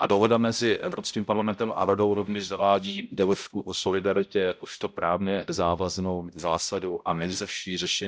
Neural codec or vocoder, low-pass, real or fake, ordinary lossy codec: codec, 16 kHz, 0.5 kbps, X-Codec, HuBERT features, trained on balanced general audio; none; fake; none